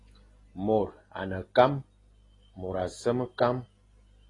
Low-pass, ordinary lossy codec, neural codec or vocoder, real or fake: 10.8 kHz; AAC, 32 kbps; none; real